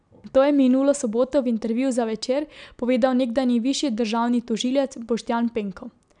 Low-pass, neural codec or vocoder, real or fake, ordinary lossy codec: 9.9 kHz; none; real; none